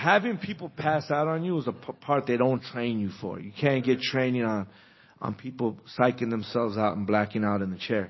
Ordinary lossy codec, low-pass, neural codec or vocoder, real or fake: MP3, 24 kbps; 7.2 kHz; none; real